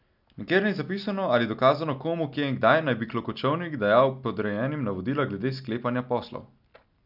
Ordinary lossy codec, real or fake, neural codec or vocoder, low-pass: none; real; none; 5.4 kHz